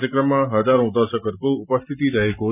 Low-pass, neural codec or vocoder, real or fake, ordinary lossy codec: 3.6 kHz; none; real; none